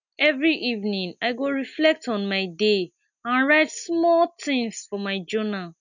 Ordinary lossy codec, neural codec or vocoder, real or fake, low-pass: none; none; real; 7.2 kHz